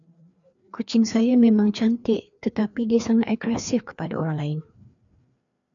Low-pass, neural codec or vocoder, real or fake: 7.2 kHz; codec, 16 kHz, 2 kbps, FreqCodec, larger model; fake